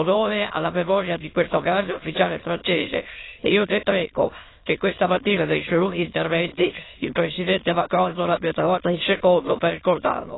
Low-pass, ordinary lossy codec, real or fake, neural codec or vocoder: 7.2 kHz; AAC, 16 kbps; fake; autoencoder, 22.05 kHz, a latent of 192 numbers a frame, VITS, trained on many speakers